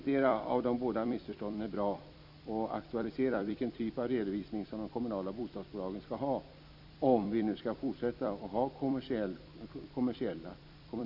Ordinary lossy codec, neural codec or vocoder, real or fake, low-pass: none; vocoder, 44.1 kHz, 128 mel bands every 256 samples, BigVGAN v2; fake; 5.4 kHz